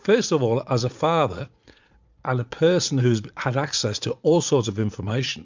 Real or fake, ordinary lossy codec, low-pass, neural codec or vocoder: fake; MP3, 64 kbps; 7.2 kHz; vocoder, 44.1 kHz, 80 mel bands, Vocos